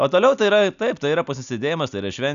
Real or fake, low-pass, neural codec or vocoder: real; 7.2 kHz; none